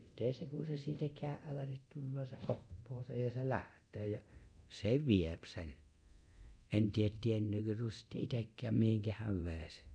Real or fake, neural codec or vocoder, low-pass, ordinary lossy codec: fake; codec, 24 kHz, 0.9 kbps, DualCodec; 9.9 kHz; none